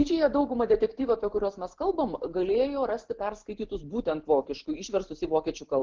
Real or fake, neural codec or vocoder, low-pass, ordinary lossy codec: real; none; 7.2 kHz; Opus, 16 kbps